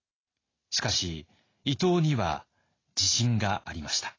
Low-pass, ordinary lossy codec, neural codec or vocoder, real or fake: 7.2 kHz; AAC, 32 kbps; none; real